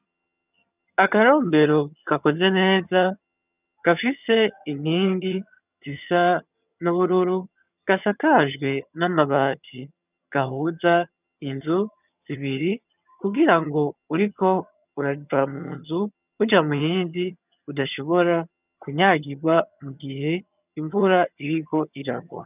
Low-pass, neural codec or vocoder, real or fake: 3.6 kHz; vocoder, 22.05 kHz, 80 mel bands, HiFi-GAN; fake